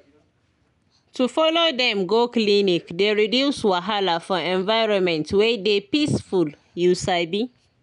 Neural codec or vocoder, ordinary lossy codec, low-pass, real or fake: none; none; 10.8 kHz; real